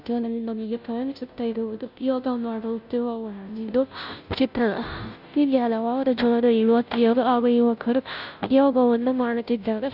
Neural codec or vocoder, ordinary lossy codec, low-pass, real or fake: codec, 16 kHz, 0.5 kbps, FunCodec, trained on Chinese and English, 25 frames a second; none; 5.4 kHz; fake